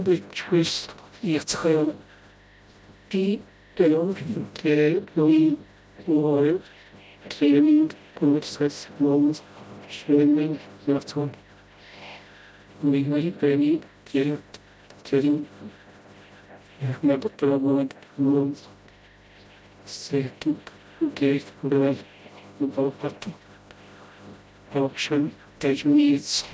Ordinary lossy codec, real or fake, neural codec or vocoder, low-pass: none; fake; codec, 16 kHz, 0.5 kbps, FreqCodec, smaller model; none